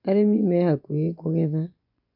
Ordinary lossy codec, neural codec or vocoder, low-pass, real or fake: none; vocoder, 24 kHz, 100 mel bands, Vocos; 5.4 kHz; fake